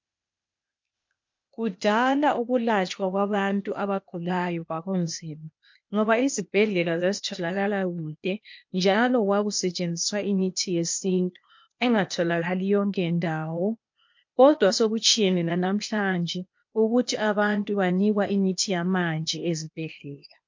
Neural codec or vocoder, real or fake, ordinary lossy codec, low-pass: codec, 16 kHz, 0.8 kbps, ZipCodec; fake; MP3, 48 kbps; 7.2 kHz